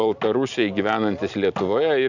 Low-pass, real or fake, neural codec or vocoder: 7.2 kHz; real; none